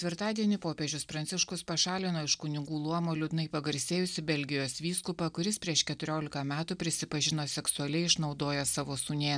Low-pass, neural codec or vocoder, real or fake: 9.9 kHz; none; real